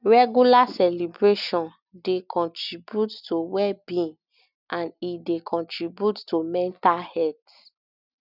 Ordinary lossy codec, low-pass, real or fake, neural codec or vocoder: none; 5.4 kHz; real; none